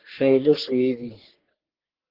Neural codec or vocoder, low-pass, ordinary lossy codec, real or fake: codec, 44.1 kHz, 3.4 kbps, Pupu-Codec; 5.4 kHz; Opus, 16 kbps; fake